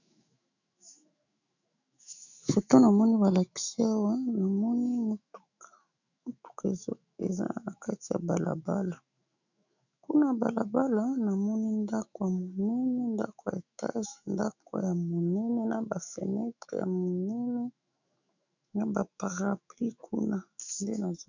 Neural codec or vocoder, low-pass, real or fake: autoencoder, 48 kHz, 128 numbers a frame, DAC-VAE, trained on Japanese speech; 7.2 kHz; fake